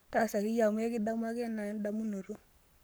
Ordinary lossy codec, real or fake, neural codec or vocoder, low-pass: none; fake; codec, 44.1 kHz, 7.8 kbps, Pupu-Codec; none